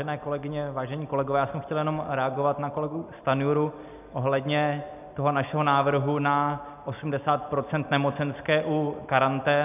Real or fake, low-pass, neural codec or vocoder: real; 3.6 kHz; none